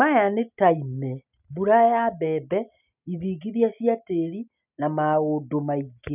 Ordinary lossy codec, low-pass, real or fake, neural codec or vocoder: none; 3.6 kHz; real; none